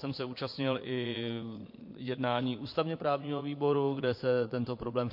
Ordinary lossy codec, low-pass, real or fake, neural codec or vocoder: MP3, 32 kbps; 5.4 kHz; fake; vocoder, 22.05 kHz, 80 mel bands, Vocos